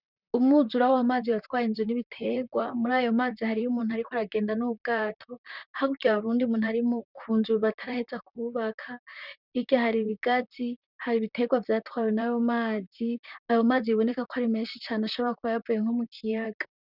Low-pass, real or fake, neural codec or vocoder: 5.4 kHz; fake; vocoder, 44.1 kHz, 128 mel bands, Pupu-Vocoder